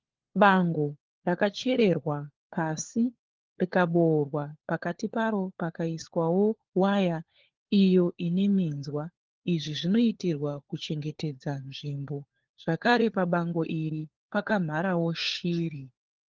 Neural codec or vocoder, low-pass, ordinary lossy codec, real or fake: codec, 16 kHz, 4 kbps, FunCodec, trained on LibriTTS, 50 frames a second; 7.2 kHz; Opus, 16 kbps; fake